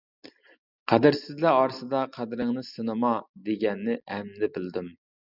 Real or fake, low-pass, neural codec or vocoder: real; 5.4 kHz; none